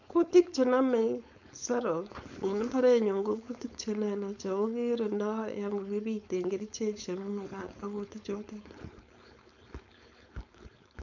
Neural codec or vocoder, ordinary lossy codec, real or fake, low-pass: codec, 16 kHz, 4.8 kbps, FACodec; none; fake; 7.2 kHz